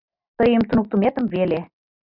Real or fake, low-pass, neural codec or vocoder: real; 5.4 kHz; none